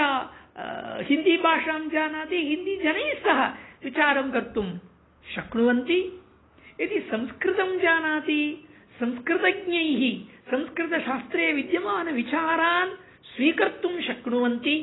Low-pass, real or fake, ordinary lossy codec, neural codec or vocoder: 7.2 kHz; real; AAC, 16 kbps; none